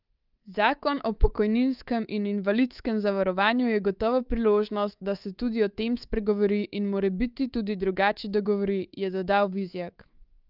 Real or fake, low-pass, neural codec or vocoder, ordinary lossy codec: fake; 5.4 kHz; codec, 24 kHz, 3.1 kbps, DualCodec; Opus, 32 kbps